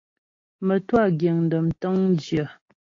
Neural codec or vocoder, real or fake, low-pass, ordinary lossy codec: none; real; 7.2 kHz; AAC, 32 kbps